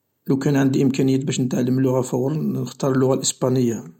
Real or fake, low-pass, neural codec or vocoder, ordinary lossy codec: real; 19.8 kHz; none; MP3, 64 kbps